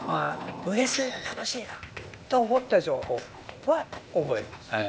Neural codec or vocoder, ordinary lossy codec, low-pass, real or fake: codec, 16 kHz, 0.8 kbps, ZipCodec; none; none; fake